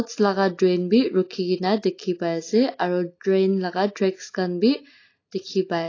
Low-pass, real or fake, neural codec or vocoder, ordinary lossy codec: 7.2 kHz; real; none; AAC, 32 kbps